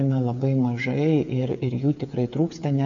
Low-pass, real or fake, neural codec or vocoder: 7.2 kHz; fake; codec, 16 kHz, 8 kbps, FreqCodec, smaller model